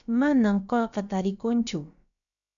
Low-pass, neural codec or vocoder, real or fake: 7.2 kHz; codec, 16 kHz, about 1 kbps, DyCAST, with the encoder's durations; fake